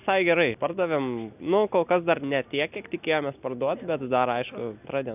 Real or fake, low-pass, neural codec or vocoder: real; 3.6 kHz; none